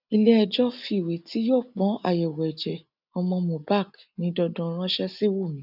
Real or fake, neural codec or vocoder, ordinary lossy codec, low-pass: real; none; none; 5.4 kHz